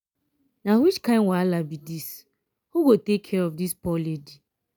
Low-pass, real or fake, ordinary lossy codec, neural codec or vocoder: none; real; none; none